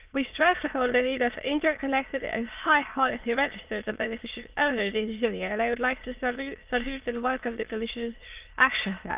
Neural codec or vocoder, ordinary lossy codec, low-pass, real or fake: autoencoder, 22.05 kHz, a latent of 192 numbers a frame, VITS, trained on many speakers; Opus, 24 kbps; 3.6 kHz; fake